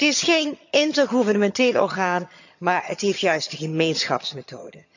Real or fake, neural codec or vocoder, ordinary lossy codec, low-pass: fake; vocoder, 22.05 kHz, 80 mel bands, HiFi-GAN; none; 7.2 kHz